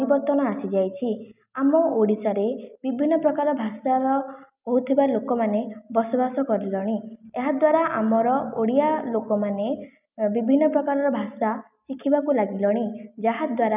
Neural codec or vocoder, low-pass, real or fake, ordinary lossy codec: none; 3.6 kHz; real; none